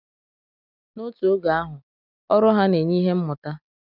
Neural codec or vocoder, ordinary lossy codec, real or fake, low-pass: none; none; real; 5.4 kHz